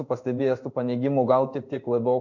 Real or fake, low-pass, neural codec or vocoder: fake; 7.2 kHz; codec, 16 kHz in and 24 kHz out, 1 kbps, XY-Tokenizer